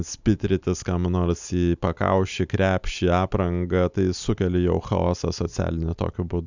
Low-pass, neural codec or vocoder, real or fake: 7.2 kHz; none; real